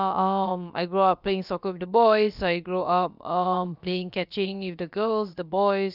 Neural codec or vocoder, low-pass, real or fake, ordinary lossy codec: codec, 16 kHz, about 1 kbps, DyCAST, with the encoder's durations; 5.4 kHz; fake; none